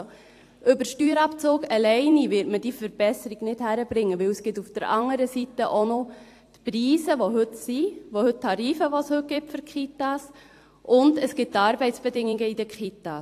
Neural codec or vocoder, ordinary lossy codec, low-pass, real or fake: vocoder, 44.1 kHz, 128 mel bands every 256 samples, BigVGAN v2; AAC, 64 kbps; 14.4 kHz; fake